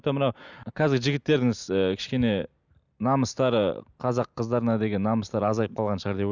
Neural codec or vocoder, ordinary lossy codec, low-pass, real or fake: none; none; 7.2 kHz; real